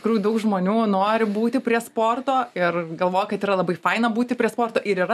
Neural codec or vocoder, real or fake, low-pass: none; real; 14.4 kHz